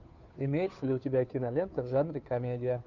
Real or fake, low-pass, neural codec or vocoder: fake; 7.2 kHz; codec, 16 kHz, 2 kbps, FunCodec, trained on Chinese and English, 25 frames a second